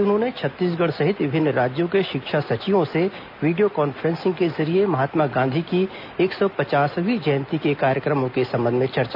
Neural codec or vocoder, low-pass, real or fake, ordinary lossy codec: none; 5.4 kHz; real; none